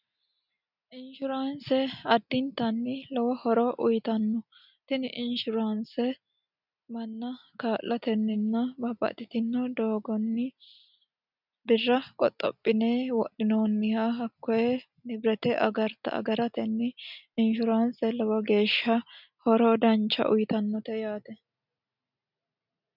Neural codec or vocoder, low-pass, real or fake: none; 5.4 kHz; real